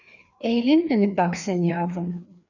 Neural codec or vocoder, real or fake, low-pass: codec, 16 kHz, 2 kbps, FreqCodec, larger model; fake; 7.2 kHz